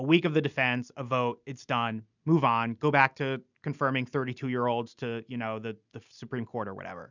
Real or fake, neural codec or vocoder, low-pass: real; none; 7.2 kHz